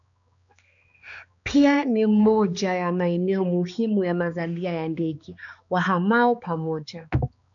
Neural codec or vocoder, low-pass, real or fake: codec, 16 kHz, 2 kbps, X-Codec, HuBERT features, trained on balanced general audio; 7.2 kHz; fake